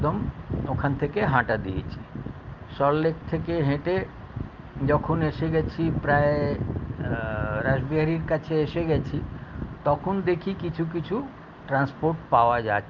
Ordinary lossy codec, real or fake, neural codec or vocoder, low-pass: Opus, 24 kbps; real; none; 7.2 kHz